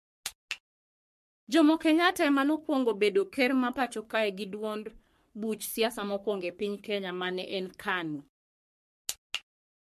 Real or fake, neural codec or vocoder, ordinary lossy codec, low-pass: fake; codec, 44.1 kHz, 3.4 kbps, Pupu-Codec; MP3, 64 kbps; 14.4 kHz